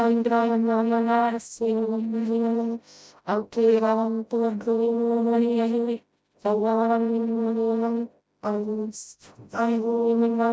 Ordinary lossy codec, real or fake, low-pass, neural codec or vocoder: none; fake; none; codec, 16 kHz, 0.5 kbps, FreqCodec, smaller model